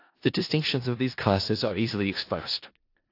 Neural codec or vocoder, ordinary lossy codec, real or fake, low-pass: codec, 16 kHz in and 24 kHz out, 0.4 kbps, LongCat-Audio-Codec, four codebook decoder; AAC, 32 kbps; fake; 5.4 kHz